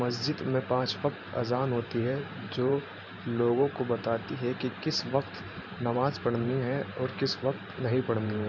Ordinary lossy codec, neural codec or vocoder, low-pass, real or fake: none; vocoder, 44.1 kHz, 128 mel bands every 512 samples, BigVGAN v2; 7.2 kHz; fake